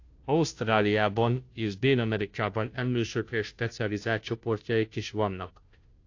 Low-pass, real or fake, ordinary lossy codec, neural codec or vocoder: 7.2 kHz; fake; AAC, 48 kbps; codec, 16 kHz, 0.5 kbps, FunCodec, trained on Chinese and English, 25 frames a second